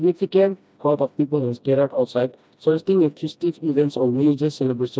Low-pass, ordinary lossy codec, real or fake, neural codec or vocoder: none; none; fake; codec, 16 kHz, 1 kbps, FreqCodec, smaller model